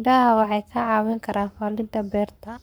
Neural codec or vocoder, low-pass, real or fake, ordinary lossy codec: codec, 44.1 kHz, 7.8 kbps, Pupu-Codec; none; fake; none